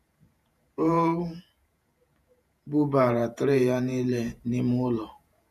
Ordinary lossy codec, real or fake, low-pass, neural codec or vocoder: none; fake; 14.4 kHz; vocoder, 48 kHz, 128 mel bands, Vocos